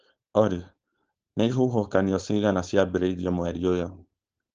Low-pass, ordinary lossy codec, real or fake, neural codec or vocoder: 7.2 kHz; Opus, 16 kbps; fake; codec, 16 kHz, 4.8 kbps, FACodec